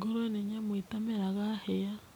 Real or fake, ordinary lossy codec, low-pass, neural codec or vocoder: real; none; none; none